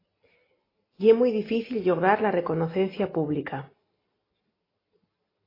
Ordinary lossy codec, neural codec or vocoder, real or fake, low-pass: AAC, 24 kbps; none; real; 5.4 kHz